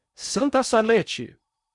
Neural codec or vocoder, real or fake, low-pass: codec, 16 kHz in and 24 kHz out, 0.8 kbps, FocalCodec, streaming, 65536 codes; fake; 10.8 kHz